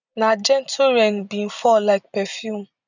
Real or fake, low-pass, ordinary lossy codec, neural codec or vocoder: real; 7.2 kHz; none; none